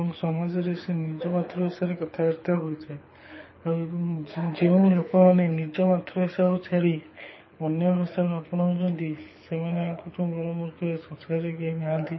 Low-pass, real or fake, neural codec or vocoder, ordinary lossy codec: 7.2 kHz; fake; codec, 24 kHz, 6 kbps, HILCodec; MP3, 24 kbps